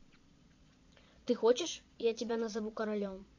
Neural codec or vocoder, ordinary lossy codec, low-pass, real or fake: vocoder, 22.05 kHz, 80 mel bands, Vocos; AAC, 48 kbps; 7.2 kHz; fake